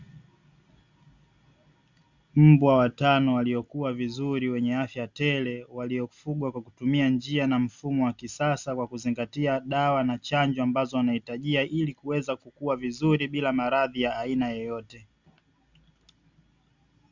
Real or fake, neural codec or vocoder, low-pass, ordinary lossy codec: real; none; 7.2 kHz; Opus, 64 kbps